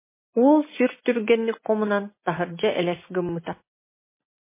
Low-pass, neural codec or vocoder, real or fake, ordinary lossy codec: 3.6 kHz; none; real; MP3, 16 kbps